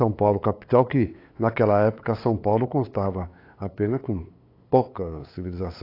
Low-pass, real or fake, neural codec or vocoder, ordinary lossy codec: 5.4 kHz; fake; codec, 16 kHz, 8 kbps, FunCodec, trained on LibriTTS, 25 frames a second; AAC, 32 kbps